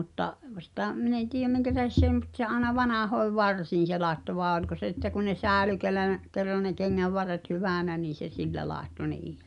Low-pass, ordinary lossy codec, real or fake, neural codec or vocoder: 10.8 kHz; none; real; none